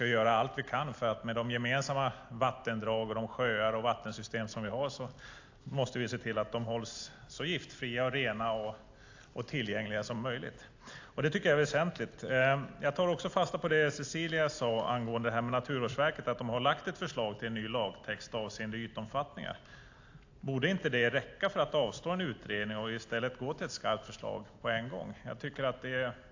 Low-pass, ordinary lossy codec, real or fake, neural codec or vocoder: 7.2 kHz; AAC, 48 kbps; real; none